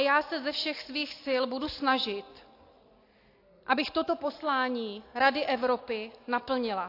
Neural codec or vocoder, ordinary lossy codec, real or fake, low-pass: none; AAC, 32 kbps; real; 5.4 kHz